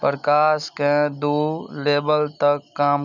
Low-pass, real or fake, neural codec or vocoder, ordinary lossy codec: 7.2 kHz; real; none; none